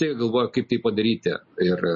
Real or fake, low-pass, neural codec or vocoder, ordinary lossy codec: real; 7.2 kHz; none; MP3, 32 kbps